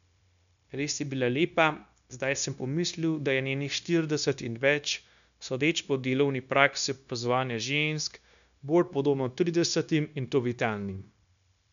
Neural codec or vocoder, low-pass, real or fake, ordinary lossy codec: codec, 16 kHz, 0.9 kbps, LongCat-Audio-Codec; 7.2 kHz; fake; none